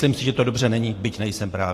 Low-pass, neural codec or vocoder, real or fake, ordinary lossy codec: 14.4 kHz; vocoder, 44.1 kHz, 128 mel bands every 512 samples, BigVGAN v2; fake; AAC, 48 kbps